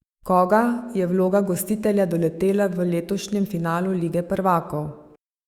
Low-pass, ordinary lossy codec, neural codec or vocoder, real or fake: 19.8 kHz; Opus, 64 kbps; autoencoder, 48 kHz, 128 numbers a frame, DAC-VAE, trained on Japanese speech; fake